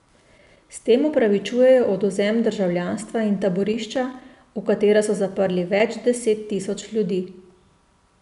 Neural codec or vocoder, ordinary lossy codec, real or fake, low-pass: none; none; real; 10.8 kHz